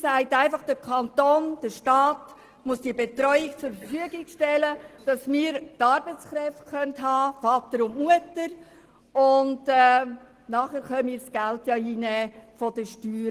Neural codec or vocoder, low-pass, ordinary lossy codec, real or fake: none; 14.4 kHz; Opus, 16 kbps; real